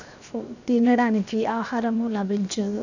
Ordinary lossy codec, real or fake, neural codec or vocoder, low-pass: none; fake; codec, 16 kHz, 0.7 kbps, FocalCodec; 7.2 kHz